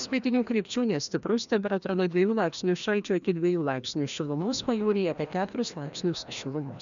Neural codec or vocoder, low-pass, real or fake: codec, 16 kHz, 1 kbps, FreqCodec, larger model; 7.2 kHz; fake